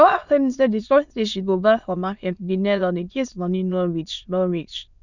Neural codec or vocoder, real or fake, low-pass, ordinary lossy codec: autoencoder, 22.05 kHz, a latent of 192 numbers a frame, VITS, trained on many speakers; fake; 7.2 kHz; none